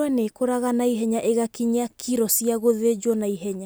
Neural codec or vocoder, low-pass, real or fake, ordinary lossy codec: none; none; real; none